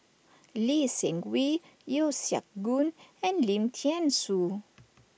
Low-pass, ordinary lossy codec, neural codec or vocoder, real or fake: none; none; none; real